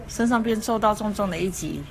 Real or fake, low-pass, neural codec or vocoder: fake; 14.4 kHz; codec, 44.1 kHz, 7.8 kbps, Pupu-Codec